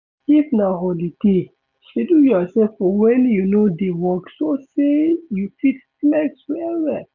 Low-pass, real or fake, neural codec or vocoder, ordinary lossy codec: 7.2 kHz; real; none; none